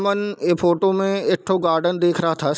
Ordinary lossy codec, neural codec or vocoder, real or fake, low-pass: none; none; real; none